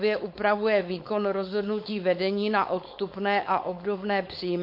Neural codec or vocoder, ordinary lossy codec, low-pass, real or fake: codec, 16 kHz, 4.8 kbps, FACodec; MP3, 32 kbps; 5.4 kHz; fake